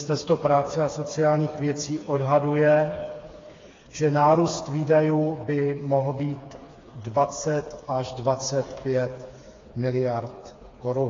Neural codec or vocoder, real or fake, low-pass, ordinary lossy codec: codec, 16 kHz, 4 kbps, FreqCodec, smaller model; fake; 7.2 kHz; AAC, 32 kbps